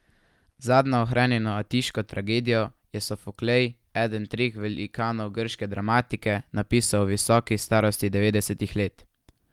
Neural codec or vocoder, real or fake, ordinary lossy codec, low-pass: none; real; Opus, 32 kbps; 19.8 kHz